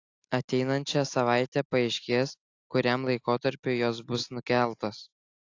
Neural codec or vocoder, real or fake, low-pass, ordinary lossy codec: none; real; 7.2 kHz; AAC, 48 kbps